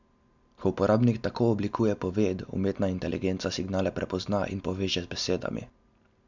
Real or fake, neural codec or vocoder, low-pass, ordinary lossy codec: real; none; 7.2 kHz; none